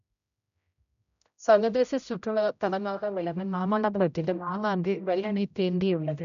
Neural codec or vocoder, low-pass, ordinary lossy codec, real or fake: codec, 16 kHz, 0.5 kbps, X-Codec, HuBERT features, trained on general audio; 7.2 kHz; none; fake